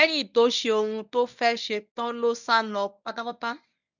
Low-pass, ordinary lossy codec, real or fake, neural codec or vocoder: 7.2 kHz; none; fake; codec, 24 kHz, 0.9 kbps, WavTokenizer, medium speech release version 1